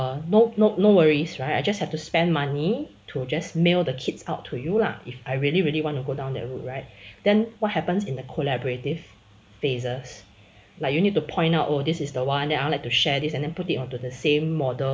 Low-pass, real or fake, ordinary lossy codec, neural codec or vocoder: none; real; none; none